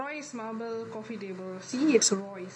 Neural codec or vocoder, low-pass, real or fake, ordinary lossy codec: none; 9.9 kHz; real; none